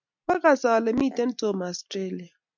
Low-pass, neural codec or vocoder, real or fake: 7.2 kHz; none; real